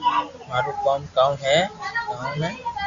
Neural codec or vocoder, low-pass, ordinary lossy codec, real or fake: none; 7.2 kHz; Opus, 64 kbps; real